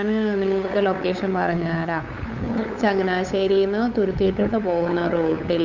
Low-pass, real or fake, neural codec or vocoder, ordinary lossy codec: 7.2 kHz; fake; codec, 16 kHz, 8 kbps, FunCodec, trained on LibriTTS, 25 frames a second; Opus, 64 kbps